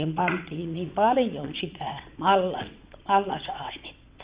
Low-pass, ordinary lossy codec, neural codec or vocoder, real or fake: 3.6 kHz; Opus, 64 kbps; vocoder, 22.05 kHz, 80 mel bands, WaveNeXt; fake